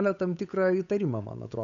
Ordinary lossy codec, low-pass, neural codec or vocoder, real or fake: MP3, 96 kbps; 7.2 kHz; codec, 16 kHz, 8 kbps, FunCodec, trained on Chinese and English, 25 frames a second; fake